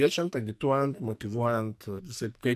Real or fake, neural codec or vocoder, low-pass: fake; codec, 44.1 kHz, 2.6 kbps, SNAC; 14.4 kHz